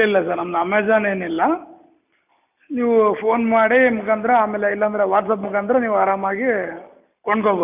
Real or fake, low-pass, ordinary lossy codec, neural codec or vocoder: real; 3.6 kHz; AAC, 32 kbps; none